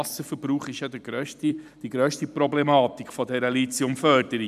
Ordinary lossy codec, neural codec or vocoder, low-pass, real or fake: none; vocoder, 44.1 kHz, 128 mel bands every 256 samples, BigVGAN v2; 14.4 kHz; fake